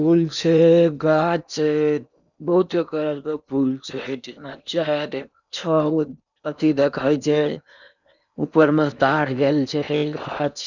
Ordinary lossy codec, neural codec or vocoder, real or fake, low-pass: none; codec, 16 kHz in and 24 kHz out, 0.8 kbps, FocalCodec, streaming, 65536 codes; fake; 7.2 kHz